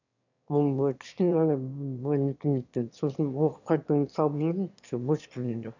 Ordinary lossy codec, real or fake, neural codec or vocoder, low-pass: none; fake; autoencoder, 22.05 kHz, a latent of 192 numbers a frame, VITS, trained on one speaker; 7.2 kHz